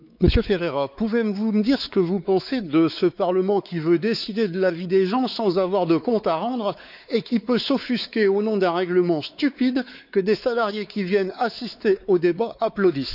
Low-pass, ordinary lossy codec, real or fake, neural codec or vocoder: 5.4 kHz; none; fake; codec, 16 kHz, 4 kbps, X-Codec, WavLM features, trained on Multilingual LibriSpeech